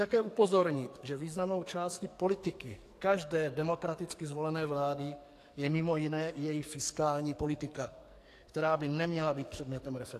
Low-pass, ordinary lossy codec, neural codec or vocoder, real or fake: 14.4 kHz; AAC, 64 kbps; codec, 44.1 kHz, 2.6 kbps, SNAC; fake